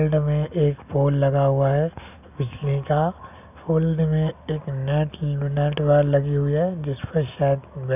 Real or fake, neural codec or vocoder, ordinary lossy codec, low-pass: real; none; none; 3.6 kHz